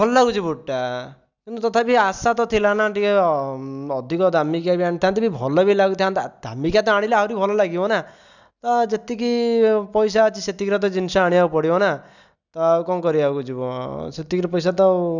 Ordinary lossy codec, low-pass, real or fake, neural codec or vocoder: none; 7.2 kHz; real; none